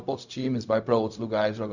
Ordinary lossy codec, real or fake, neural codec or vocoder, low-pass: none; fake; codec, 16 kHz, 0.4 kbps, LongCat-Audio-Codec; 7.2 kHz